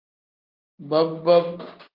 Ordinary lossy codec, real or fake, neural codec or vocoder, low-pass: Opus, 32 kbps; real; none; 5.4 kHz